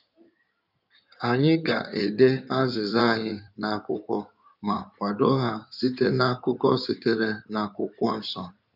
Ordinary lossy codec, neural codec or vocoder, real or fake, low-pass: none; codec, 16 kHz in and 24 kHz out, 2.2 kbps, FireRedTTS-2 codec; fake; 5.4 kHz